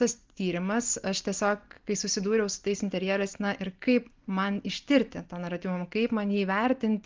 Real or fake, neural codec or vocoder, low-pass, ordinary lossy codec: real; none; 7.2 kHz; Opus, 32 kbps